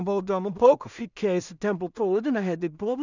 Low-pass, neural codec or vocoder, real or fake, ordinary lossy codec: 7.2 kHz; codec, 16 kHz in and 24 kHz out, 0.4 kbps, LongCat-Audio-Codec, two codebook decoder; fake; none